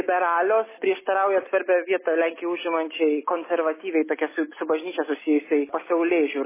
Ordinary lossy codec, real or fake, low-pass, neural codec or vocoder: MP3, 16 kbps; real; 3.6 kHz; none